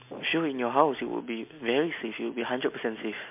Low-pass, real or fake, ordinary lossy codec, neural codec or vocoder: 3.6 kHz; real; MP3, 32 kbps; none